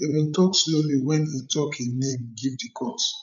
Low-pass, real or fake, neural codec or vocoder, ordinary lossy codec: 7.2 kHz; fake; codec, 16 kHz, 8 kbps, FreqCodec, larger model; none